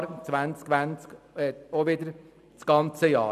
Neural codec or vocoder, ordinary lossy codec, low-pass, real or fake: none; none; 14.4 kHz; real